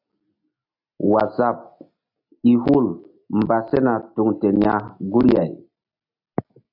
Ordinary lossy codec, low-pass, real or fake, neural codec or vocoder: AAC, 48 kbps; 5.4 kHz; real; none